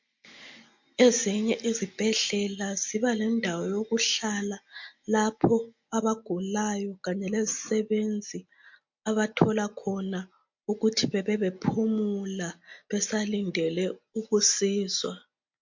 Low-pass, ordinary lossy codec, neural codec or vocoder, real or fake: 7.2 kHz; MP3, 48 kbps; none; real